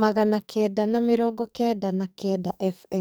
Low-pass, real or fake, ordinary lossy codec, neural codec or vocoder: none; fake; none; codec, 44.1 kHz, 2.6 kbps, SNAC